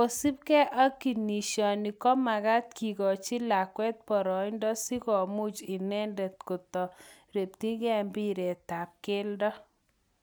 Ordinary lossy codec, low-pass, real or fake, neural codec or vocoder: none; none; real; none